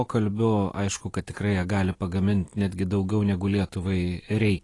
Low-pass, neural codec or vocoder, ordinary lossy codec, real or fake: 10.8 kHz; none; AAC, 32 kbps; real